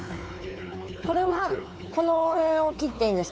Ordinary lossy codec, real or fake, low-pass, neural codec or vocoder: none; fake; none; codec, 16 kHz, 4 kbps, X-Codec, WavLM features, trained on Multilingual LibriSpeech